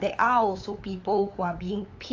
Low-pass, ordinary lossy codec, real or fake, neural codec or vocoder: 7.2 kHz; AAC, 32 kbps; fake; codec, 16 kHz, 8 kbps, FunCodec, trained on LibriTTS, 25 frames a second